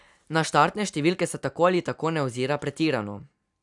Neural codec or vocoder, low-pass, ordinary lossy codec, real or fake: none; 10.8 kHz; none; real